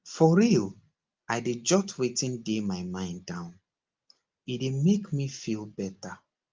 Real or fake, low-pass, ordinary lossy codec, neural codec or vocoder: real; 7.2 kHz; Opus, 24 kbps; none